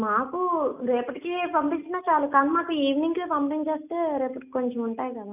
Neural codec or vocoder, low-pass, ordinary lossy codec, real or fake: none; 3.6 kHz; none; real